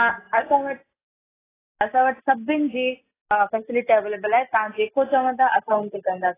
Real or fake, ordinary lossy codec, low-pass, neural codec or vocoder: real; AAC, 16 kbps; 3.6 kHz; none